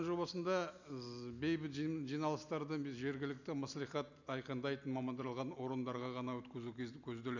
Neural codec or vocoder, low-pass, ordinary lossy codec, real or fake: none; 7.2 kHz; none; real